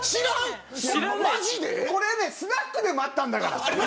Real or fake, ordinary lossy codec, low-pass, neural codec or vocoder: real; none; none; none